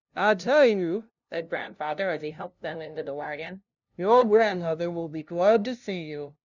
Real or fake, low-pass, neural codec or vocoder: fake; 7.2 kHz; codec, 16 kHz, 0.5 kbps, FunCodec, trained on LibriTTS, 25 frames a second